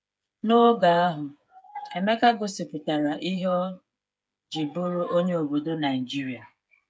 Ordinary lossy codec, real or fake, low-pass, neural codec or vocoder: none; fake; none; codec, 16 kHz, 8 kbps, FreqCodec, smaller model